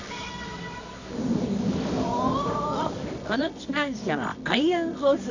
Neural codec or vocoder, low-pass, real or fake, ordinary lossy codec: codec, 24 kHz, 0.9 kbps, WavTokenizer, medium music audio release; 7.2 kHz; fake; none